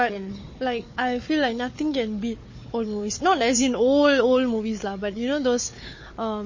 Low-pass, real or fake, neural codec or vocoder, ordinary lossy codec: 7.2 kHz; fake; codec, 16 kHz, 4 kbps, FunCodec, trained on Chinese and English, 50 frames a second; MP3, 32 kbps